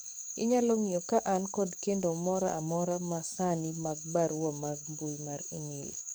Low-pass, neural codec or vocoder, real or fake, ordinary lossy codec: none; codec, 44.1 kHz, 7.8 kbps, DAC; fake; none